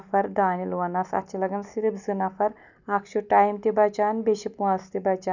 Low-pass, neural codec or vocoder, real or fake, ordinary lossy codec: 7.2 kHz; none; real; none